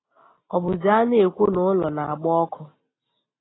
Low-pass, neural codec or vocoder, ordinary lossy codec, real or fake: 7.2 kHz; none; AAC, 16 kbps; real